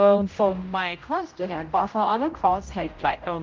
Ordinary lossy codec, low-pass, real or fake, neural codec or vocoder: Opus, 32 kbps; 7.2 kHz; fake; codec, 16 kHz, 0.5 kbps, X-Codec, HuBERT features, trained on general audio